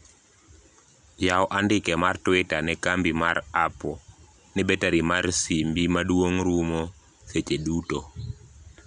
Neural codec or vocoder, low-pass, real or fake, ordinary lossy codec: none; 9.9 kHz; real; none